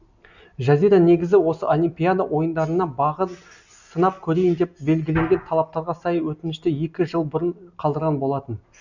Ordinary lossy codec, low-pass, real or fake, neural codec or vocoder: none; 7.2 kHz; real; none